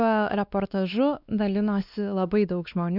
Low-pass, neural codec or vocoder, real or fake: 5.4 kHz; codec, 16 kHz, 2 kbps, X-Codec, WavLM features, trained on Multilingual LibriSpeech; fake